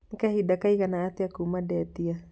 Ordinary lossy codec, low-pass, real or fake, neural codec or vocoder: none; none; real; none